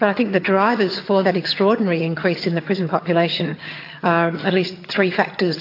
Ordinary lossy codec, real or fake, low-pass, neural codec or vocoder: AAC, 32 kbps; fake; 5.4 kHz; vocoder, 22.05 kHz, 80 mel bands, HiFi-GAN